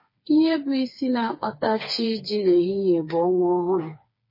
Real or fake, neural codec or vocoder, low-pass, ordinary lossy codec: fake; codec, 16 kHz, 4 kbps, FreqCodec, smaller model; 5.4 kHz; MP3, 24 kbps